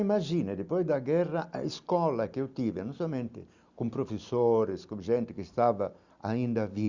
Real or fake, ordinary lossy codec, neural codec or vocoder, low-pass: real; Opus, 64 kbps; none; 7.2 kHz